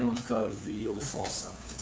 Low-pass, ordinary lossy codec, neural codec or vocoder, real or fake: none; none; codec, 16 kHz, 2 kbps, FunCodec, trained on LibriTTS, 25 frames a second; fake